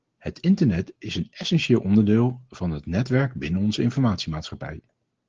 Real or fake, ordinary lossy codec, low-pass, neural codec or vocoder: real; Opus, 16 kbps; 7.2 kHz; none